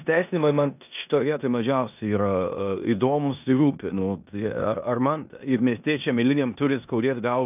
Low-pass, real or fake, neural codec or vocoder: 3.6 kHz; fake; codec, 16 kHz in and 24 kHz out, 0.9 kbps, LongCat-Audio-Codec, four codebook decoder